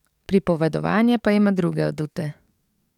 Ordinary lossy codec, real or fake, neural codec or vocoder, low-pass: none; fake; codec, 44.1 kHz, 7.8 kbps, DAC; 19.8 kHz